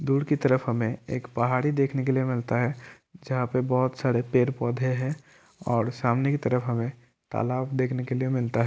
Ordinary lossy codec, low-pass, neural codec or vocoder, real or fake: none; none; none; real